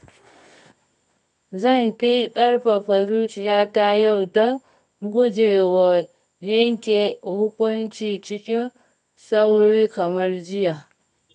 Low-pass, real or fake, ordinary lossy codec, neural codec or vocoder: 10.8 kHz; fake; MP3, 96 kbps; codec, 24 kHz, 0.9 kbps, WavTokenizer, medium music audio release